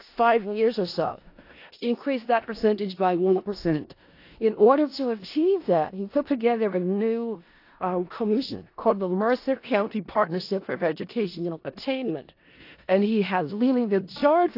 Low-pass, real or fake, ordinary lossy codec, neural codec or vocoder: 5.4 kHz; fake; AAC, 32 kbps; codec, 16 kHz in and 24 kHz out, 0.4 kbps, LongCat-Audio-Codec, four codebook decoder